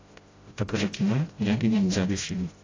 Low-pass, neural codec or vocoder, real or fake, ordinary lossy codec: 7.2 kHz; codec, 16 kHz, 0.5 kbps, FreqCodec, smaller model; fake; AAC, 32 kbps